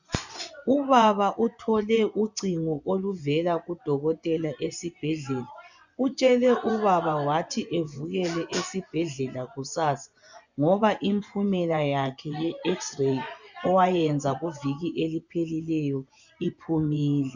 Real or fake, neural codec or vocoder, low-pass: fake; vocoder, 24 kHz, 100 mel bands, Vocos; 7.2 kHz